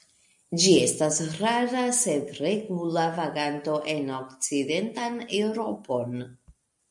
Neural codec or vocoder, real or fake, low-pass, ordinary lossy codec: none; real; 10.8 kHz; MP3, 64 kbps